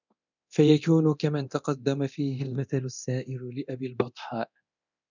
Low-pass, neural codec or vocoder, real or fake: 7.2 kHz; codec, 24 kHz, 0.9 kbps, DualCodec; fake